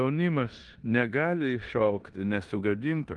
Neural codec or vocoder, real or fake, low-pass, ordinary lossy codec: codec, 16 kHz in and 24 kHz out, 0.9 kbps, LongCat-Audio-Codec, four codebook decoder; fake; 10.8 kHz; Opus, 24 kbps